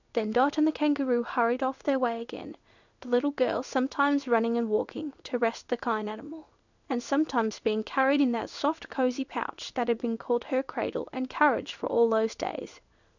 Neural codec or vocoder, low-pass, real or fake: codec, 16 kHz in and 24 kHz out, 1 kbps, XY-Tokenizer; 7.2 kHz; fake